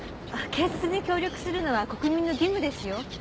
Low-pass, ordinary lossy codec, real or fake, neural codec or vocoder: none; none; real; none